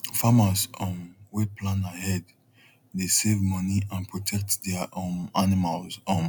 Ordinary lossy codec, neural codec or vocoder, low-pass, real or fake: none; none; none; real